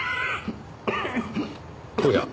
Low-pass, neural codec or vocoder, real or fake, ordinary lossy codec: none; none; real; none